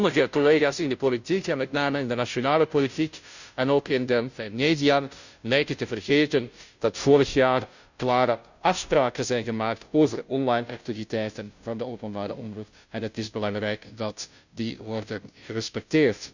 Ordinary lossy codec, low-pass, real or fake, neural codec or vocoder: none; 7.2 kHz; fake; codec, 16 kHz, 0.5 kbps, FunCodec, trained on Chinese and English, 25 frames a second